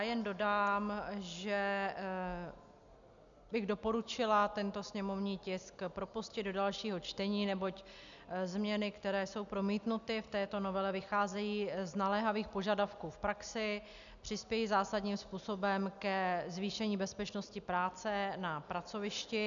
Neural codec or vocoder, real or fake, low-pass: none; real; 7.2 kHz